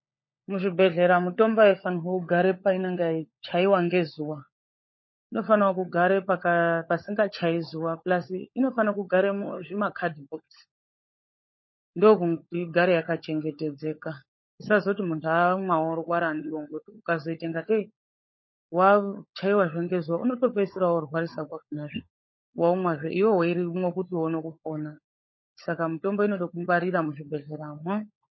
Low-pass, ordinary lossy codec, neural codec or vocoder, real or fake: 7.2 kHz; MP3, 24 kbps; codec, 16 kHz, 16 kbps, FunCodec, trained on LibriTTS, 50 frames a second; fake